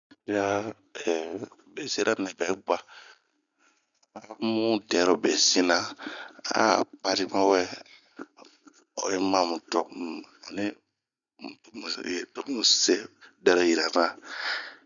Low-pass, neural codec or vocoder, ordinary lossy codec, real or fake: 7.2 kHz; none; none; real